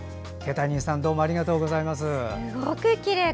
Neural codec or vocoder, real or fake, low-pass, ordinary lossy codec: none; real; none; none